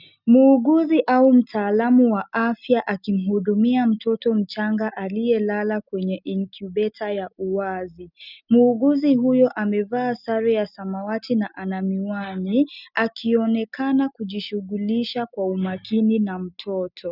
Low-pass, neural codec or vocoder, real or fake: 5.4 kHz; none; real